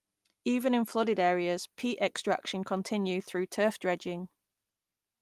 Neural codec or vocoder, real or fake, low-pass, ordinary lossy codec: none; real; 14.4 kHz; Opus, 32 kbps